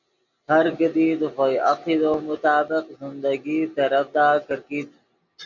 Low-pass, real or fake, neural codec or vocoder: 7.2 kHz; real; none